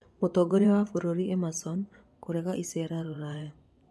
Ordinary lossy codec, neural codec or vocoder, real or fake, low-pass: none; vocoder, 24 kHz, 100 mel bands, Vocos; fake; none